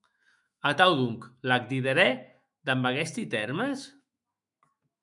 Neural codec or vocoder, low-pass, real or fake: autoencoder, 48 kHz, 128 numbers a frame, DAC-VAE, trained on Japanese speech; 10.8 kHz; fake